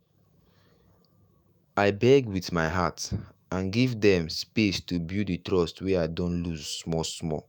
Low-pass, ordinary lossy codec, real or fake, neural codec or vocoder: none; none; fake; autoencoder, 48 kHz, 128 numbers a frame, DAC-VAE, trained on Japanese speech